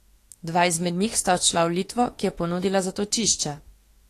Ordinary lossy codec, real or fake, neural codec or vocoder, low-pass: AAC, 48 kbps; fake; autoencoder, 48 kHz, 32 numbers a frame, DAC-VAE, trained on Japanese speech; 14.4 kHz